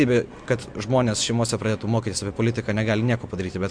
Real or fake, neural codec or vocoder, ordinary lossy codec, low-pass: real; none; MP3, 64 kbps; 9.9 kHz